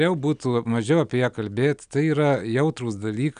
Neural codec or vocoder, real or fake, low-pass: none; real; 9.9 kHz